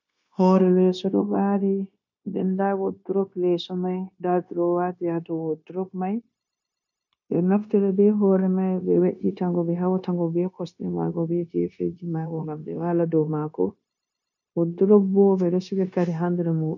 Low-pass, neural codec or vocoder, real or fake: 7.2 kHz; codec, 16 kHz, 0.9 kbps, LongCat-Audio-Codec; fake